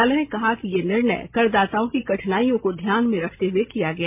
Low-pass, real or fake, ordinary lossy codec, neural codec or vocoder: 3.6 kHz; real; MP3, 32 kbps; none